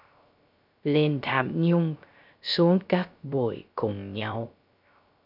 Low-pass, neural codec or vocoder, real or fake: 5.4 kHz; codec, 16 kHz, 0.3 kbps, FocalCodec; fake